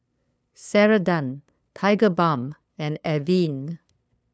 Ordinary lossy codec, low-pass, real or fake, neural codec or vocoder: none; none; fake; codec, 16 kHz, 2 kbps, FunCodec, trained on LibriTTS, 25 frames a second